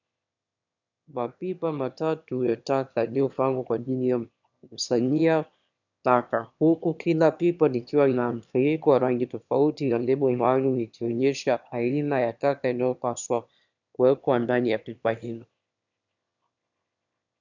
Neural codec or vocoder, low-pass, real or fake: autoencoder, 22.05 kHz, a latent of 192 numbers a frame, VITS, trained on one speaker; 7.2 kHz; fake